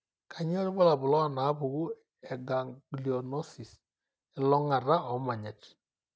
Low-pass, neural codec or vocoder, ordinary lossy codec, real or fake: none; none; none; real